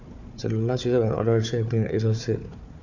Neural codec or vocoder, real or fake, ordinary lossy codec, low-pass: codec, 16 kHz, 4 kbps, FunCodec, trained on Chinese and English, 50 frames a second; fake; none; 7.2 kHz